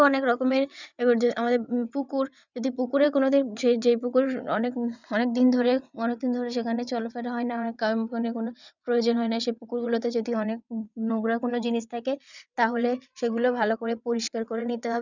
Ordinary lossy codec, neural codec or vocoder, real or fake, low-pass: none; vocoder, 22.05 kHz, 80 mel bands, WaveNeXt; fake; 7.2 kHz